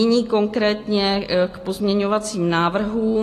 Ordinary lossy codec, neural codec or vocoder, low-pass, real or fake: AAC, 48 kbps; none; 14.4 kHz; real